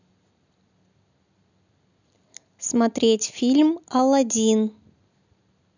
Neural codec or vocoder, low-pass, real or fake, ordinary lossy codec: none; 7.2 kHz; real; none